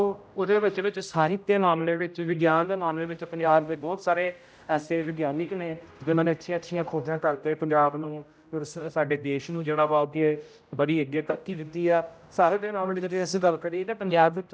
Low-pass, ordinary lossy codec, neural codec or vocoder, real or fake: none; none; codec, 16 kHz, 0.5 kbps, X-Codec, HuBERT features, trained on general audio; fake